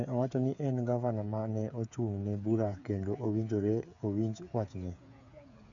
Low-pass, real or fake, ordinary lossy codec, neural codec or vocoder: 7.2 kHz; fake; none; codec, 16 kHz, 8 kbps, FreqCodec, smaller model